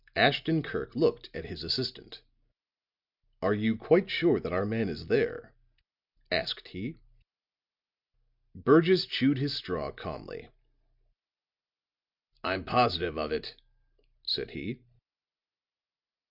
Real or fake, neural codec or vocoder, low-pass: real; none; 5.4 kHz